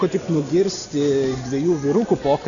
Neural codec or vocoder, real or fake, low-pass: none; real; 7.2 kHz